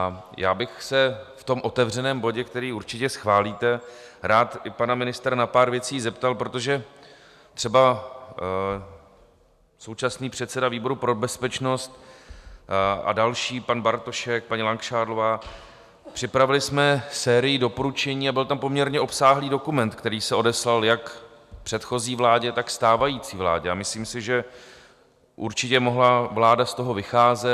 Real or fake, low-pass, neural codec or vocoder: real; 14.4 kHz; none